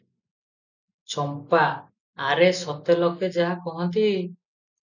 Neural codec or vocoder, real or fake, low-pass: none; real; 7.2 kHz